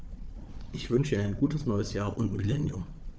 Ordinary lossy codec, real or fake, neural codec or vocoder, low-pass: none; fake; codec, 16 kHz, 4 kbps, FunCodec, trained on Chinese and English, 50 frames a second; none